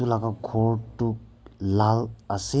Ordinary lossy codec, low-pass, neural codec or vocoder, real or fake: none; none; none; real